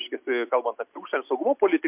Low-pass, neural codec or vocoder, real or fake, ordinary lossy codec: 3.6 kHz; none; real; MP3, 32 kbps